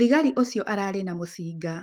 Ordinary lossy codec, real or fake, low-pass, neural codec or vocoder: Opus, 24 kbps; fake; 19.8 kHz; autoencoder, 48 kHz, 128 numbers a frame, DAC-VAE, trained on Japanese speech